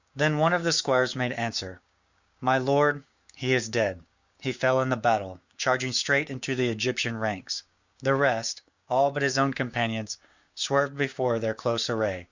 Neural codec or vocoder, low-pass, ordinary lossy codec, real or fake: codec, 16 kHz, 6 kbps, DAC; 7.2 kHz; Opus, 64 kbps; fake